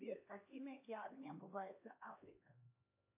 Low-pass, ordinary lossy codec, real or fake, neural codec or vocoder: 3.6 kHz; MP3, 24 kbps; fake; codec, 16 kHz, 2 kbps, X-Codec, HuBERT features, trained on LibriSpeech